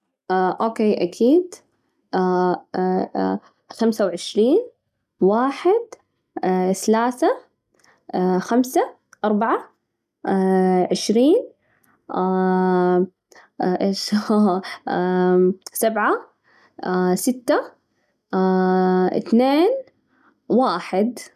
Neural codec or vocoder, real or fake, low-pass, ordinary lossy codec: none; real; 14.4 kHz; none